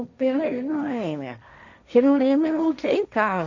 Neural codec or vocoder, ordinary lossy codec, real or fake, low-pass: codec, 16 kHz, 1.1 kbps, Voila-Tokenizer; none; fake; 7.2 kHz